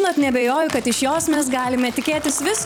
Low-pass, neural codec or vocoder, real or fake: 19.8 kHz; vocoder, 44.1 kHz, 128 mel bands every 256 samples, BigVGAN v2; fake